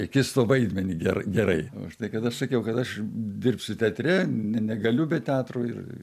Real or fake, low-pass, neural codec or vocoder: real; 14.4 kHz; none